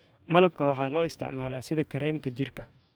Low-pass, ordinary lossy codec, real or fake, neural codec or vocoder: none; none; fake; codec, 44.1 kHz, 2.6 kbps, DAC